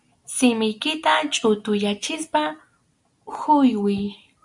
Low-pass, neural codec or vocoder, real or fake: 10.8 kHz; none; real